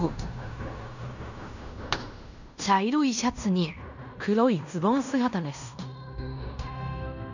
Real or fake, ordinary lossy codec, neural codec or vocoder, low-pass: fake; none; codec, 16 kHz in and 24 kHz out, 0.9 kbps, LongCat-Audio-Codec, fine tuned four codebook decoder; 7.2 kHz